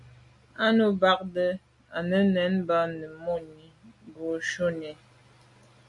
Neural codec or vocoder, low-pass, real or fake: none; 10.8 kHz; real